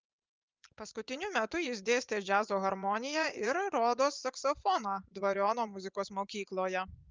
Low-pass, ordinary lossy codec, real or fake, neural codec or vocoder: 7.2 kHz; Opus, 24 kbps; fake; vocoder, 24 kHz, 100 mel bands, Vocos